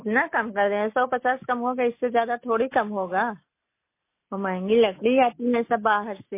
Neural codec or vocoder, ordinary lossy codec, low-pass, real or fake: none; MP3, 24 kbps; 3.6 kHz; real